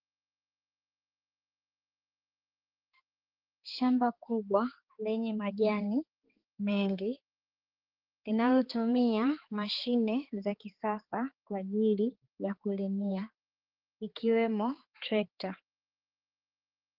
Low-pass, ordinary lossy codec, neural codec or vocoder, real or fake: 5.4 kHz; Opus, 16 kbps; codec, 16 kHz, 4 kbps, X-Codec, HuBERT features, trained on balanced general audio; fake